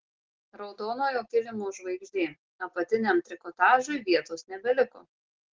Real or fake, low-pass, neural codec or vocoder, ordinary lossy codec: real; 7.2 kHz; none; Opus, 16 kbps